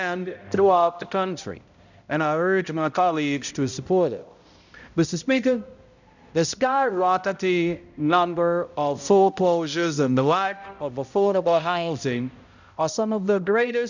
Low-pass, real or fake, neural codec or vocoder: 7.2 kHz; fake; codec, 16 kHz, 0.5 kbps, X-Codec, HuBERT features, trained on balanced general audio